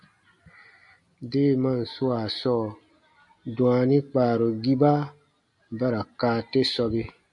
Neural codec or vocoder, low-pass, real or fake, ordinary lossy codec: none; 10.8 kHz; real; MP3, 64 kbps